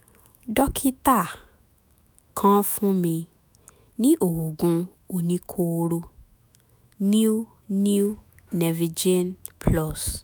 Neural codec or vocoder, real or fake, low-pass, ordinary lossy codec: autoencoder, 48 kHz, 128 numbers a frame, DAC-VAE, trained on Japanese speech; fake; none; none